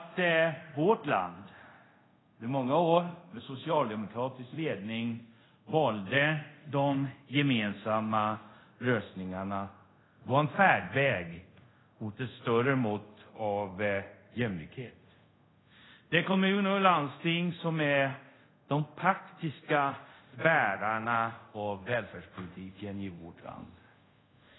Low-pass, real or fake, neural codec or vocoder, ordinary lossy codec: 7.2 kHz; fake; codec, 24 kHz, 0.5 kbps, DualCodec; AAC, 16 kbps